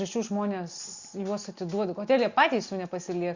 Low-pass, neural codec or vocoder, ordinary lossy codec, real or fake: 7.2 kHz; none; Opus, 64 kbps; real